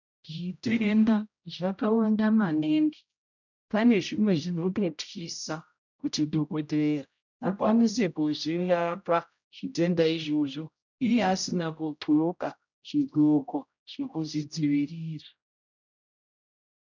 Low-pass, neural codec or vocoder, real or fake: 7.2 kHz; codec, 16 kHz, 0.5 kbps, X-Codec, HuBERT features, trained on general audio; fake